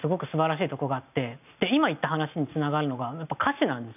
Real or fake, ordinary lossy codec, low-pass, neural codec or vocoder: real; none; 3.6 kHz; none